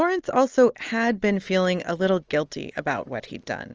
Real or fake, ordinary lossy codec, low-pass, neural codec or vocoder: real; Opus, 24 kbps; 7.2 kHz; none